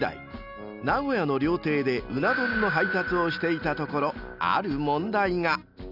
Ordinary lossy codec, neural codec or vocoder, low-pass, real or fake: none; none; 5.4 kHz; real